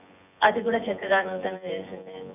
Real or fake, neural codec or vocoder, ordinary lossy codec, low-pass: fake; vocoder, 24 kHz, 100 mel bands, Vocos; none; 3.6 kHz